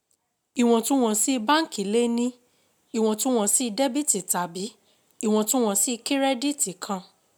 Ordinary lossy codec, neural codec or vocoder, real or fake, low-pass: none; none; real; none